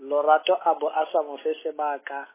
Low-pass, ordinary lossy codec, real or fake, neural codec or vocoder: 3.6 kHz; MP3, 16 kbps; real; none